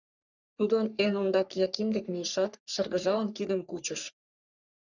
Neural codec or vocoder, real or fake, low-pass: codec, 44.1 kHz, 3.4 kbps, Pupu-Codec; fake; 7.2 kHz